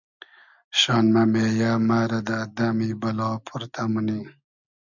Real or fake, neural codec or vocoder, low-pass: real; none; 7.2 kHz